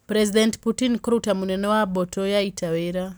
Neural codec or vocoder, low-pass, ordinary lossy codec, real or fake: none; none; none; real